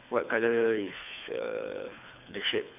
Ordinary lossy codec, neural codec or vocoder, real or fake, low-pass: none; codec, 24 kHz, 3 kbps, HILCodec; fake; 3.6 kHz